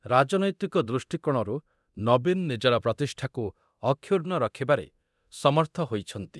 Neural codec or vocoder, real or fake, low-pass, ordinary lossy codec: codec, 24 kHz, 0.9 kbps, DualCodec; fake; none; none